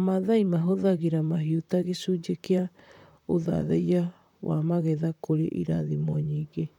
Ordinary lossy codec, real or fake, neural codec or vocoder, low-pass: none; fake; vocoder, 44.1 kHz, 128 mel bands, Pupu-Vocoder; 19.8 kHz